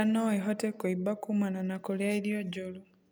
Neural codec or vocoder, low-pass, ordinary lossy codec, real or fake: none; none; none; real